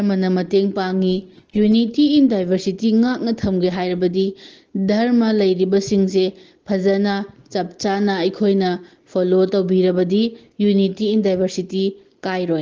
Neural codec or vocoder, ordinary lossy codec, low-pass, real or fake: none; Opus, 16 kbps; 7.2 kHz; real